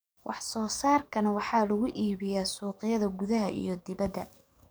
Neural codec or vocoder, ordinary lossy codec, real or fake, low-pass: codec, 44.1 kHz, 7.8 kbps, DAC; none; fake; none